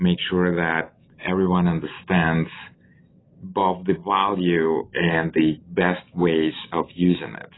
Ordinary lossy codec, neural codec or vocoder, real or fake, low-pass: AAC, 16 kbps; none; real; 7.2 kHz